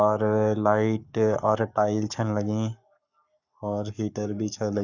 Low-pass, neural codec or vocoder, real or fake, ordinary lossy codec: 7.2 kHz; codec, 44.1 kHz, 7.8 kbps, Pupu-Codec; fake; none